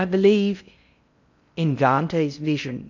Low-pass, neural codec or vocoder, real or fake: 7.2 kHz; codec, 16 kHz in and 24 kHz out, 0.6 kbps, FocalCodec, streaming, 4096 codes; fake